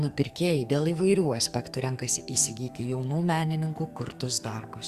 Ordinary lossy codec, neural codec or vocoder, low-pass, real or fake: Opus, 64 kbps; codec, 44.1 kHz, 2.6 kbps, SNAC; 14.4 kHz; fake